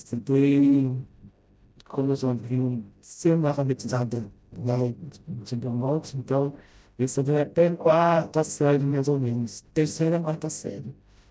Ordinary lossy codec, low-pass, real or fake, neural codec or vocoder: none; none; fake; codec, 16 kHz, 0.5 kbps, FreqCodec, smaller model